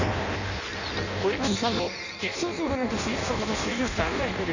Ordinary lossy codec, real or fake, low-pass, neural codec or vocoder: none; fake; 7.2 kHz; codec, 16 kHz in and 24 kHz out, 0.6 kbps, FireRedTTS-2 codec